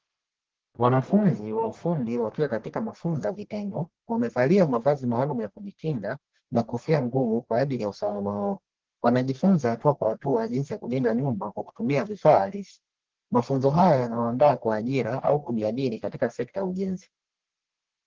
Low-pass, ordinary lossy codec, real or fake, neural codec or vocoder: 7.2 kHz; Opus, 16 kbps; fake; codec, 44.1 kHz, 1.7 kbps, Pupu-Codec